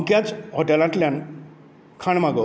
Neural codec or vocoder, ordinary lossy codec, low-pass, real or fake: none; none; none; real